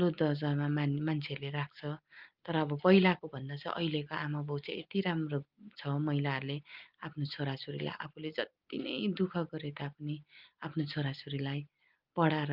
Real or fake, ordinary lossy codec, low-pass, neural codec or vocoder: real; Opus, 32 kbps; 5.4 kHz; none